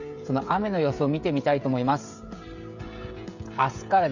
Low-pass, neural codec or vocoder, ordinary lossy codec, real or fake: 7.2 kHz; codec, 16 kHz, 16 kbps, FreqCodec, smaller model; none; fake